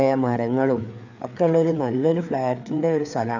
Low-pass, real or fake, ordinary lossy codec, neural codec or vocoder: 7.2 kHz; fake; none; codec, 16 kHz, 4 kbps, FreqCodec, larger model